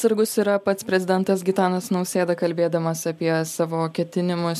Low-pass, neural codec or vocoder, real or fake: 14.4 kHz; none; real